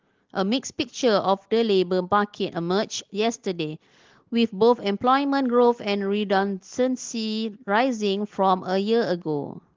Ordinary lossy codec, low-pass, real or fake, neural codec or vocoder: Opus, 16 kbps; 7.2 kHz; real; none